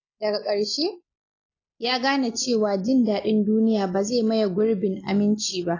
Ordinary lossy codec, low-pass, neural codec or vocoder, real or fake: AAC, 32 kbps; 7.2 kHz; none; real